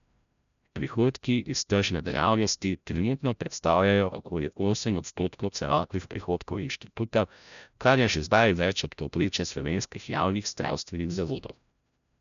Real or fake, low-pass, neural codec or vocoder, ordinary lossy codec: fake; 7.2 kHz; codec, 16 kHz, 0.5 kbps, FreqCodec, larger model; none